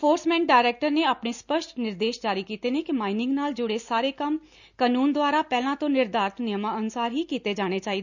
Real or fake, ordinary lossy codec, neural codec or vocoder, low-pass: real; none; none; 7.2 kHz